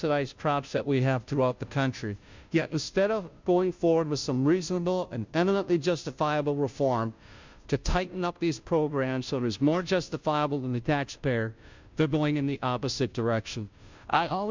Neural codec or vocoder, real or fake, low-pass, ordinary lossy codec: codec, 16 kHz, 0.5 kbps, FunCodec, trained on Chinese and English, 25 frames a second; fake; 7.2 kHz; MP3, 64 kbps